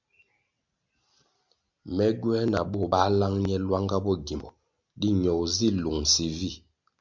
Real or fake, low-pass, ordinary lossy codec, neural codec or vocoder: real; 7.2 kHz; MP3, 64 kbps; none